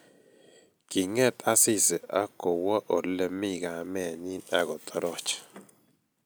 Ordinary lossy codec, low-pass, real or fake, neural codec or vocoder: none; none; real; none